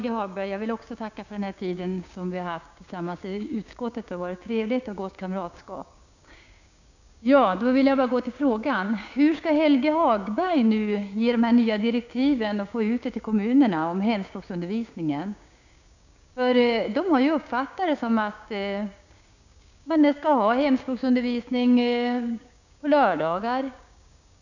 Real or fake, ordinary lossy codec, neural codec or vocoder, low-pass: fake; none; codec, 16 kHz, 6 kbps, DAC; 7.2 kHz